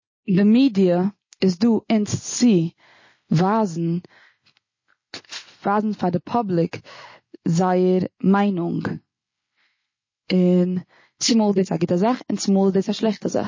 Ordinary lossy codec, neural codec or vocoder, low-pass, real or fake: MP3, 32 kbps; none; 7.2 kHz; real